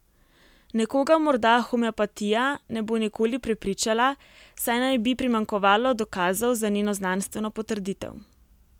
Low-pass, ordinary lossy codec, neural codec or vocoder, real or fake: 19.8 kHz; MP3, 96 kbps; none; real